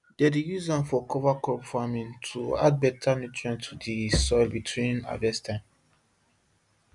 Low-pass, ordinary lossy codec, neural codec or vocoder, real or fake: 10.8 kHz; none; none; real